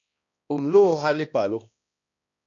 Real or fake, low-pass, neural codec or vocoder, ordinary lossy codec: fake; 7.2 kHz; codec, 16 kHz, 1 kbps, X-Codec, HuBERT features, trained on balanced general audio; AAC, 48 kbps